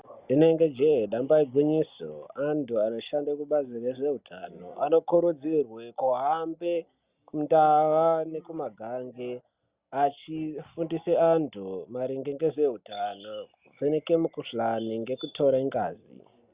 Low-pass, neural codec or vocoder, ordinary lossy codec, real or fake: 3.6 kHz; none; Opus, 64 kbps; real